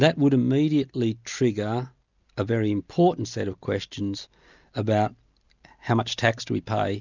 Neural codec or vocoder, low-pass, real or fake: none; 7.2 kHz; real